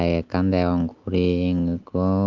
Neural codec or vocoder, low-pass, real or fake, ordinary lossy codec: none; 7.2 kHz; real; Opus, 24 kbps